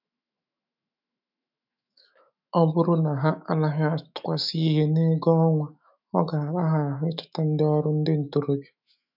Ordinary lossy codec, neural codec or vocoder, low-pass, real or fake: none; autoencoder, 48 kHz, 128 numbers a frame, DAC-VAE, trained on Japanese speech; 5.4 kHz; fake